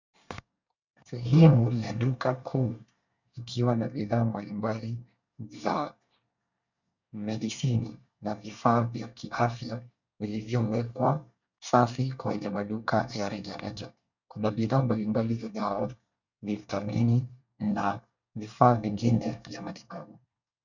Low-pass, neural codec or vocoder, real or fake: 7.2 kHz; codec, 24 kHz, 1 kbps, SNAC; fake